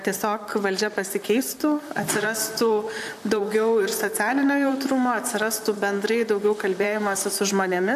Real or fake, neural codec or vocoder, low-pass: fake; vocoder, 44.1 kHz, 128 mel bands, Pupu-Vocoder; 14.4 kHz